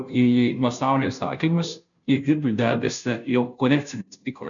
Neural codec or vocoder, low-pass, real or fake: codec, 16 kHz, 0.5 kbps, FunCodec, trained on Chinese and English, 25 frames a second; 7.2 kHz; fake